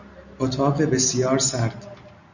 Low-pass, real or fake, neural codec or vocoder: 7.2 kHz; real; none